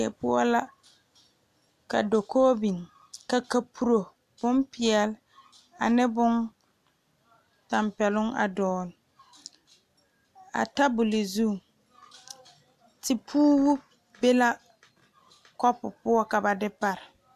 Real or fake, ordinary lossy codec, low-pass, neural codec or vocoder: real; MP3, 96 kbps; 14.4 kHz; none